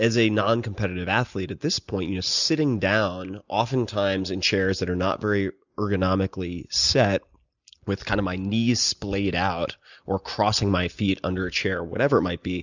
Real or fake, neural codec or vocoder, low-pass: real; none; 7.2 kHz